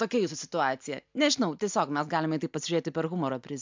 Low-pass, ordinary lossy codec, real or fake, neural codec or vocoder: 7.2 kHz; MP3, 64 kbps; real; none